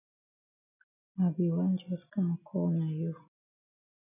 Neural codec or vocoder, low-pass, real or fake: none; 3.6 kHz; real